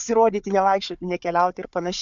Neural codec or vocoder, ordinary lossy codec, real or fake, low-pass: codec, 16 kHz, 4 kbps, FunCodec, trained on Chinese and English, 50 frames a second; MP3, 64 kbps; fake; 7.2 kHz